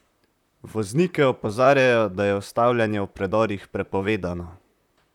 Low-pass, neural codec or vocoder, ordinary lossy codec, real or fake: 19.8 kHz; vocoder, 44.1 kHz, 128 mel bands, Pupu-Vocoder; none; fake